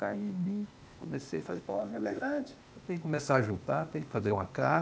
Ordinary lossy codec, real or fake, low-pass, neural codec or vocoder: none; fake; none; codec, 16 kHz, 0.8 kbps, ZipCodec